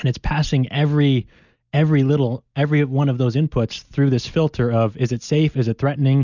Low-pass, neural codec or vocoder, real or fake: 7.2 kHz; none; real